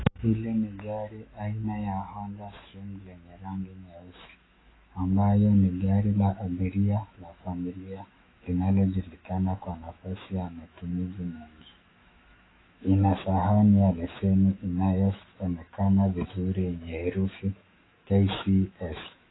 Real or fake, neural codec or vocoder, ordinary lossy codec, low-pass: fake; autoencoder, 48 kHz, 128 numbers a frame, DAC-VAE, trained on Japanese speech; AAC, 16 kbps; 7.2 kHz